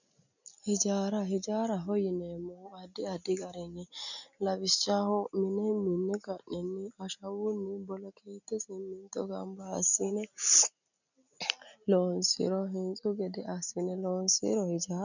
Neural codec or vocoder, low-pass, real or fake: none; 7.2 kHz; real